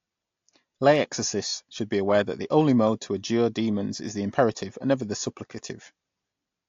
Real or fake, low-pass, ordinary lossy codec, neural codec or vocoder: real; 7.2 kHz; AAC, 48 kbps; none